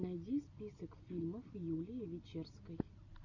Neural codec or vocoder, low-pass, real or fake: none; 7.2 kHz; real